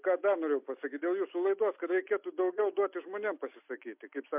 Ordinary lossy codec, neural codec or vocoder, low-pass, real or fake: MP3, 32 kbps; none; 3.6 kHz; real